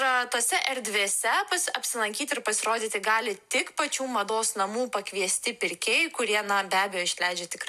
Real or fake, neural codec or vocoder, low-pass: real; none; 14.4 kHz